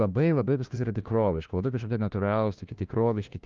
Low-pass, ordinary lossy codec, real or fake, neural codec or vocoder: 7.2 kHz; Opus, 32 kbps; fake; codec, 16 kHz, 1 kbps, FunCodec, trained on LibriTTS, 50 frames a second